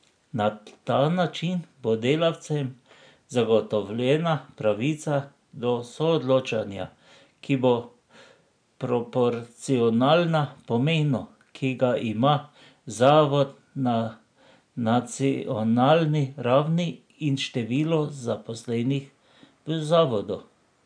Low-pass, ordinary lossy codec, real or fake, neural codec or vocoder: 9.9 kHz; none; real; none